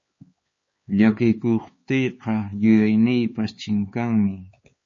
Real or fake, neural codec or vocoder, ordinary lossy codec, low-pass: fake; codec, 16 kHz, 4 kbps, X-Codec, HuBERT features, trained on LibriSpeech; MP3, 32 kbps; 7.2 kHz